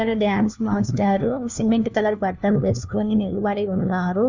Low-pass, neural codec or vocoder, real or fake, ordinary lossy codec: 7.2 kHz; codec, 16 kHz, 1 kbps, FunCodec, trained on LibriTTS, 50 frames a second; fake; none